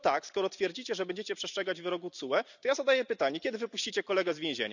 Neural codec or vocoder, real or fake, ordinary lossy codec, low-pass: none; real; none; 7.2 kHz